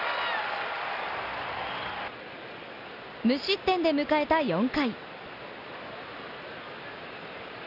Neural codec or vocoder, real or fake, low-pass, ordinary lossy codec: none; real; 5.4 kHz; none